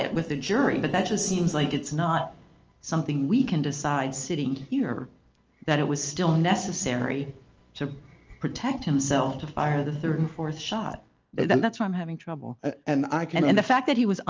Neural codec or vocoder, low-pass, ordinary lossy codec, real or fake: none; 7.2 kHz; Opus, 24 kbps; real